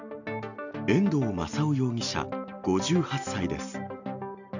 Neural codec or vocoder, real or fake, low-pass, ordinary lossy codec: none; real; 7.2 kHz; none